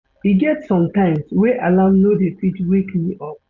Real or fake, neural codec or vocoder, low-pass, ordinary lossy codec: real; none; 7.2 kHz; none